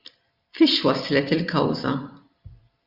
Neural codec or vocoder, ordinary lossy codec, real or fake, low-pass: vocoder, 44.1 kHz, 128 mel bands every 256 samples, BigVGAN v2; Opus, 64 kbps; fake; 5.4 kHz